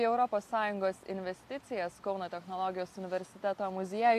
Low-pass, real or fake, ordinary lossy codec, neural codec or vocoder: 14.4 kHz; real; AAC, 64 kbps; none